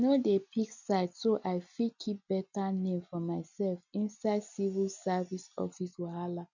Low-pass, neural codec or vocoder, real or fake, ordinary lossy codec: 7.2 kHz; none; real; none